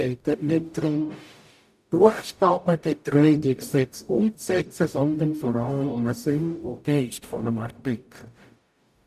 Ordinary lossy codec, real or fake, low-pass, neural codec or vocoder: none; fake; 14.4 kHz; codec, 44.1 kHz, 0.9 kbps, DAC